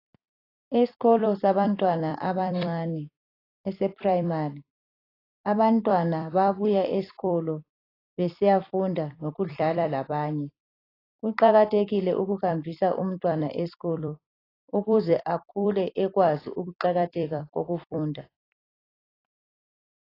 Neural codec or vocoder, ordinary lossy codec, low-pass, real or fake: vocoder, 44.1 kHz, 128 mel bands every 256 samples, BigVGAN v2; AAC, 24 kbps; 5.4 kHz; fake